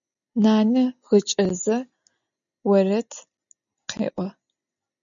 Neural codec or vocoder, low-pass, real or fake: none; 7.2 kHz; real